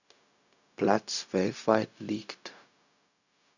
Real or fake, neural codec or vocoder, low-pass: fake; codec, 16 kHz, 0.4 kbps, LongCat-Audio-Codec; 7.2 kHz